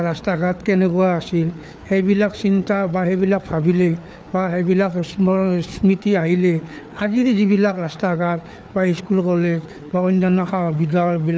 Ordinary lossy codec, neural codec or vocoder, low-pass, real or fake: none; codec, 16 kHz, 4 kbps, FreqCodec, larger model; none; fake